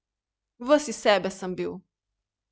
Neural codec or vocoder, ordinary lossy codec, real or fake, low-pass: none; none; real; none